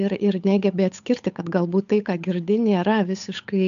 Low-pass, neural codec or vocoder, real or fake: 7.2 kHz; codec, 16 kHz, 8 kbps, FunCodec, trained on Chinese and English, 25 frames a second; fake